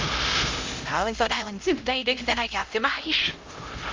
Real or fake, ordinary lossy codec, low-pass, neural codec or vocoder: fake; Opus, 32 kbps; 7.2 kHz; codec, 16 kHz, 0.5 kbps, X-Codec, HuBERT features, trained on LibriSpeech